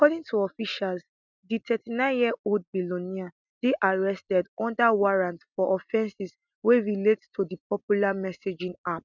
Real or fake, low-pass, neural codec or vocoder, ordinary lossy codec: real; 7.2 kHz; none; none